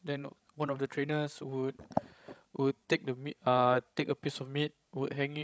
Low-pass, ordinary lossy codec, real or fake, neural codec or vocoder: none; none; fake; codec, 16 kHz, 16 kbps, FreqCodec, larger model